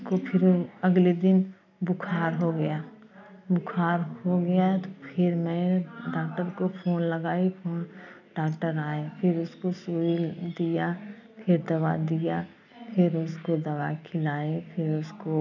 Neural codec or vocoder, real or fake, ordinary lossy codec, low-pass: none; real; none; 7.2 kHz